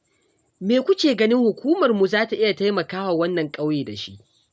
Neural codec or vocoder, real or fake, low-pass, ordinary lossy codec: none; real; none; none